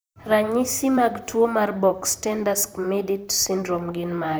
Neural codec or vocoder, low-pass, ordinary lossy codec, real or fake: vocoder, 44.1 kHz, 128 mel bands, Pupu-Vocoder; none; none; fake